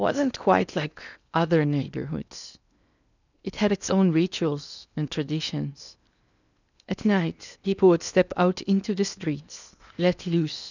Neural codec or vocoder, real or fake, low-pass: codec, 16 kHz in and 24 kHz out, 0.8 kbps, FocalCodec, streaming, 65536 codes; fake; 7.2 kHz